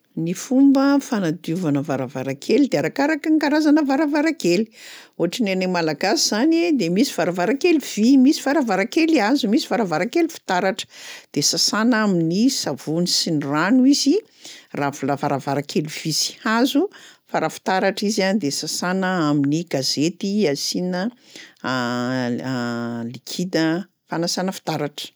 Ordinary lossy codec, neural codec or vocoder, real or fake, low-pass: none; none; real; none